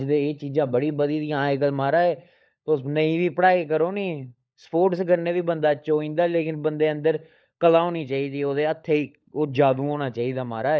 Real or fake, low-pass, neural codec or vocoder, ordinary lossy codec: fake; none; codec, 16 kHz, 16 kbps, FunCodec, trained on LibriTTS, 50 frames a second; none